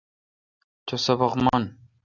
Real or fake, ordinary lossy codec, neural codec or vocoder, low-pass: real; Opus, 64 kbps; none; 7.2 kHz